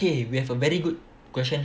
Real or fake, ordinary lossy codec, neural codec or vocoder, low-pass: real; none; none; none